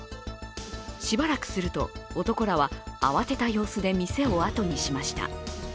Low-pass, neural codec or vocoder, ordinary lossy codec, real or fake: none; none; none; real